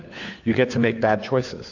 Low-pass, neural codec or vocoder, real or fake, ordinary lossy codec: 7.2 kHz; codec, 16 kHz, 4 kbps, FunCodec, trained on LibriTTS, 50 frames a second; fake; AAC, 48 kbps